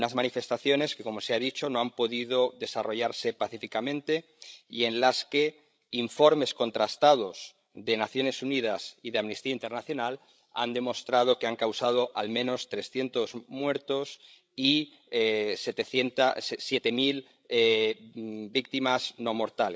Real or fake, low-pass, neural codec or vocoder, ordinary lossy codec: fake; none; codec, 16 kHz, 16 kbps, FreqCodec, larger model; none